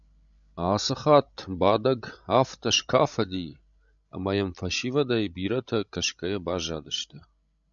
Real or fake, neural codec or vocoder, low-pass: fake; codec, 16 kHz, 16 kbps, FreqCodec, larger model; 7.2 kHz